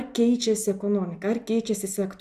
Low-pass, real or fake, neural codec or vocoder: 14.4 kHz; real; none